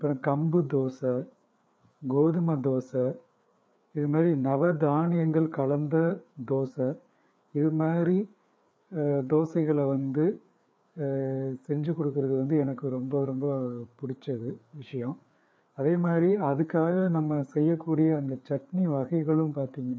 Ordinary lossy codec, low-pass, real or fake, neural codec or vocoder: none; none; fake; codec, 16 kHz, 4 kbps, FreqCodec, larger model